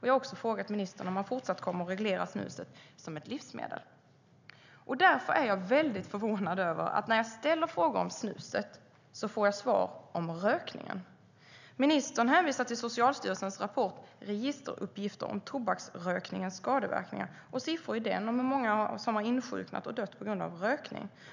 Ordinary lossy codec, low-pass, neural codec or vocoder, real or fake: none; 7.2 kHz; none; real